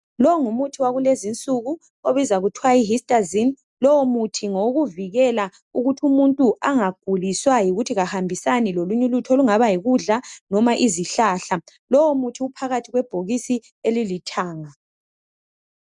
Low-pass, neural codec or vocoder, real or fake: 10.8 kHz; none; real